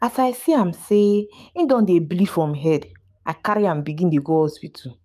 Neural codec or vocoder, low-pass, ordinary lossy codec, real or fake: codec, 44.1 kHz, 7.8 kbps, DAC; 14.4 kHz; none; fake